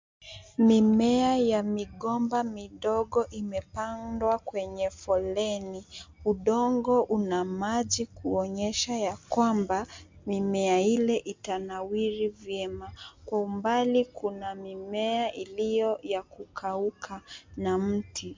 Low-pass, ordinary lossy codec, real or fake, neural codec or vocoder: 7.2 kHz; MP3, 64 kbps; real; none